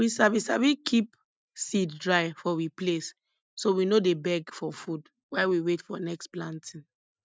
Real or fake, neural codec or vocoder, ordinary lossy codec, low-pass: real; none; none; none